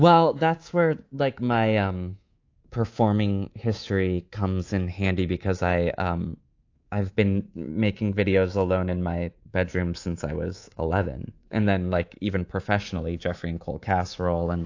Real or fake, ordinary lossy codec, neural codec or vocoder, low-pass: fake; AAC, 48 kbps; codec, 24 kHz, 3.1 kbps, DualCodec; 7.2 kHz